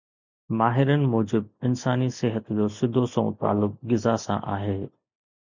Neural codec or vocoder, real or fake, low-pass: none; real; 7.2 kHz